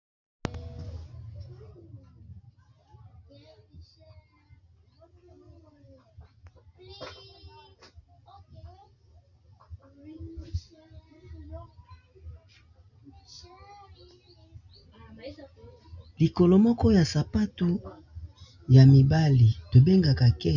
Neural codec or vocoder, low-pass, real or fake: none; 7.2 kHz; real